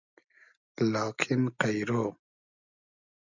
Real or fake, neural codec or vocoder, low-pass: real; none; 7.2 kHz